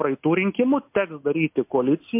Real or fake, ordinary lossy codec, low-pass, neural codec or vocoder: real; MP3, 32 kbps; 3.6 kHz; none